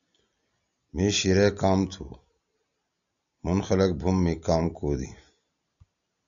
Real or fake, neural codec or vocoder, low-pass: real; none; 7.2 kHz